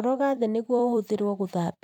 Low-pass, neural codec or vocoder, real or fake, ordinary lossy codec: 19.8 kHz; vocoder, 44.1 kHz, 128 mel bands every 256 samples, BigVGAN v2; fake; none